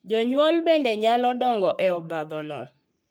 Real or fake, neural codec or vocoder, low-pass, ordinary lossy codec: fake; codec, 44.1 kHz, 3.4 kbps, Pupu-Codec; none; none